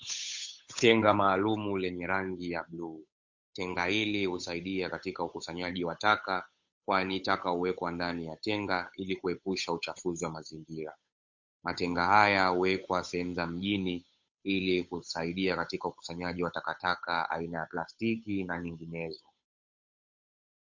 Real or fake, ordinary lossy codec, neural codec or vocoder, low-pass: fake; MP3, 48 kbps; codec, 16 kHz, 8 kbps, FunCodec, trained on Chinese and English, 25 frames a second; 7.2 kHz